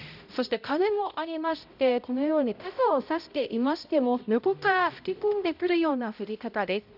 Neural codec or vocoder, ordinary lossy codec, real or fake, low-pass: codec, 16 kHz, 0.5 kbps, X-Codec, HuBERT features, trained on balanced general audio; none; fake; 5.4 kHz